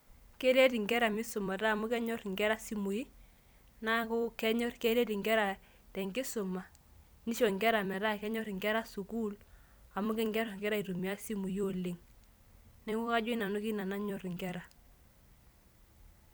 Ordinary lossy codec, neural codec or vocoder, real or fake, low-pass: none; vocoder, 44.1 kHz, 128 mel bands every 256 samples, BigVGAN v2; fake; none